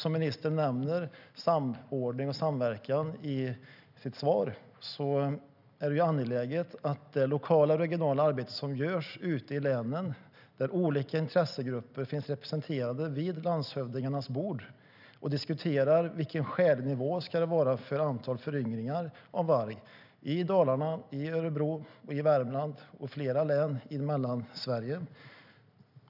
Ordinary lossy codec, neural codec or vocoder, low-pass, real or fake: none; none; 5.4 kHz; real